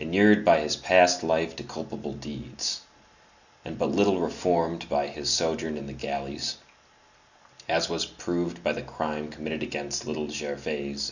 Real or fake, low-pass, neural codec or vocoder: real; 7.2 kHz; none